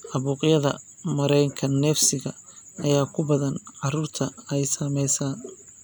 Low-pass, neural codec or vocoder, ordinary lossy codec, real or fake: none; none; none; real